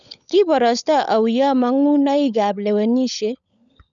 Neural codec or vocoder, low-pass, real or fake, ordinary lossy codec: codec, 16 kHz, 16 kbps, FunCodec, trained on LibriTTS, 50 frames a second; 7.2 kHz; fake; none